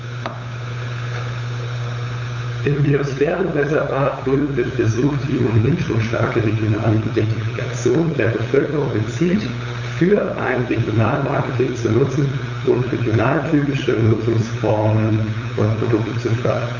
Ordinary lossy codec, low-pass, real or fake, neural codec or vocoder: none; 7.2 kHz; fake; codec, 16 kHz, 8 kbps, FunCodec, trained on LibriTTS, 25 frames a second